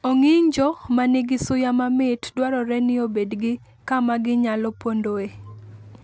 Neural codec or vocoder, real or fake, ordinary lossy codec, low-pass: none; real; none; none